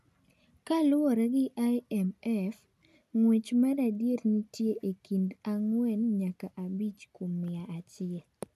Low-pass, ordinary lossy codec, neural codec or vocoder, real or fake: 14.4 kHz; none; none; real